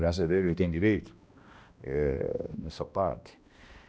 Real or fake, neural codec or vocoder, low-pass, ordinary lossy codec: fake; codec, 16 kHz, 1 kbps, X-Codec, HuBERT features, trained on balanced general audio; none; none